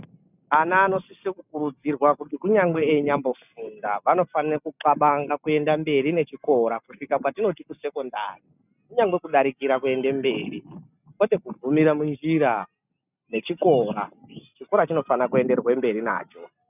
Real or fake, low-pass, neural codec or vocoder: real; 3.6 kHz; none